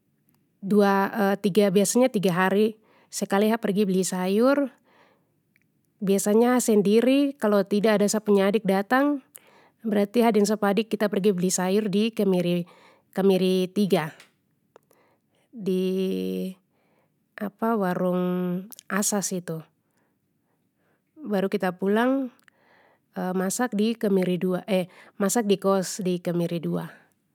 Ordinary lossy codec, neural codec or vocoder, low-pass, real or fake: none; none; 19.8 kHz; real